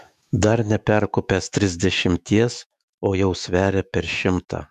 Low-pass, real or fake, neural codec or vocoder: 14.4 kHz; fake; autoencoder, 48 kHz, 128 numbers a frame, DAC-VAE, trained on Japanese speech